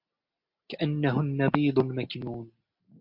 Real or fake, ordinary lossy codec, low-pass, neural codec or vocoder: real; MP3, 48 kbps; 5.4 kHz; none